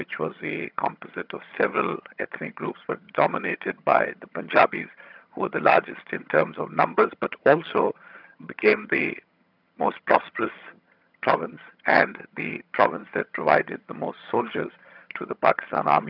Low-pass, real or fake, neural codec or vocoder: 5.4 kHz; fake; vocoder, 22.05 kHz, 80 mel bands, HiFi-GAN